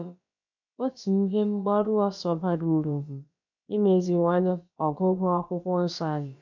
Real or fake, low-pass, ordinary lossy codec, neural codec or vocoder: fake; 7.2 kHz; none; codec, 16 kHz, about 1 kbps, DyCAST, with the encoder's durations